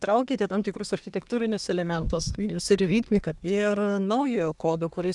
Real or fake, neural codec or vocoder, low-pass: fake; codec, 24 kHz, 1 kbps, SNAC; 10.8 kHz